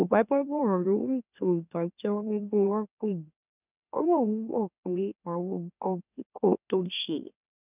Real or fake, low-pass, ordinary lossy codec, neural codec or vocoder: fake; 3.6 kHz; none; autoencoder, 44.1 kHz, a latent of 192 numbers a frame, MeloTTS